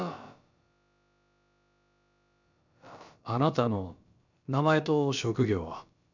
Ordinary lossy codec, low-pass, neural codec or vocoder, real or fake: none; 7.2 kHz; codec, 16 kHz, about 1 kbps, DyCAST, with the encoder's durations; fake